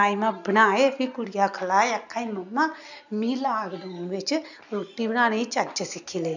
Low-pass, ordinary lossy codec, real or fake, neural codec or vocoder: 7.2 kHz; none; real; none